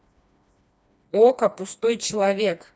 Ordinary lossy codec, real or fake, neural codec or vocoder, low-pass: none; fake; codec, 16 kHz, 2 kbps, FreqCodec, smaller model; none